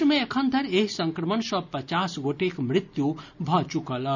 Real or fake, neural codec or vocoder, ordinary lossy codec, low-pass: real; none; none; 7.2 kHz